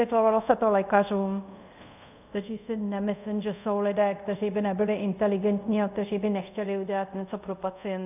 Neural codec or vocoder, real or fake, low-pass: codec, 24 kHz, 0.5 kbps, DualCodec; fake; 3.6 kHz